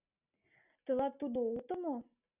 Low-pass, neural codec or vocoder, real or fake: 3.6 kHz; none; real